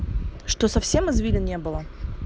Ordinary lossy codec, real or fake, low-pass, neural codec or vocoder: none; real; none; none